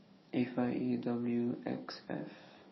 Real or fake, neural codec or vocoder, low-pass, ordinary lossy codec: fake; codec, 44.1 kHz, 7.8 kbps, DAC; 7.2 kHz; MP3, 24 kbps